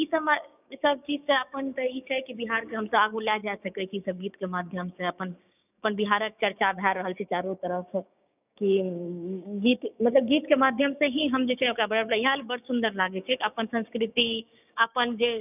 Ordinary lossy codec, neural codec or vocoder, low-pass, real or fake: none; codec, 16 kHz, 6 kbps, DAC; 3.6 kHz; fake